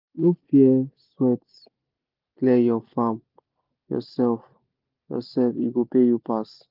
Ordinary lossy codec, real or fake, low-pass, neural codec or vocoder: Opus, 24 kbps; real; 5.4 kHz; none